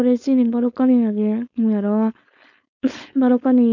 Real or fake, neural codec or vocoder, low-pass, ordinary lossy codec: fake; codec, 16 kHz, 4.8 kbps, FACodec; 7.2 kHz; none